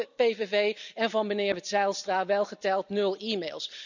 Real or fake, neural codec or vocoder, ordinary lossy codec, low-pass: real; none; none; 7.2 kHz